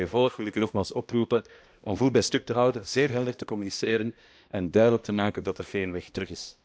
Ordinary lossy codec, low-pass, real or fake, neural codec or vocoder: none; none; fake; codec, 16 kHz, 1 kbps, X-Codec, HuBERT features, trained on balanced general audio